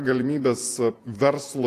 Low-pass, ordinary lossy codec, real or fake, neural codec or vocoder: 14.4 kHz; AAC, 64 kbps; fake; vocoder, 48 kHz, 128 mel bands, Vocos